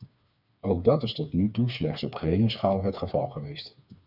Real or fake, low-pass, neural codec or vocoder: fake; 5.4 kHz; codec, 16 kHz, 4 kbps, FreqCodec, smaller model